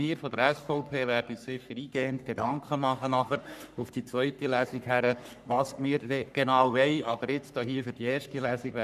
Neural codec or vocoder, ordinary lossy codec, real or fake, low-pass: codec, 44.1 kHz, 3.4 kbps, Pupu-Codec; none; fake; 14.4 kHz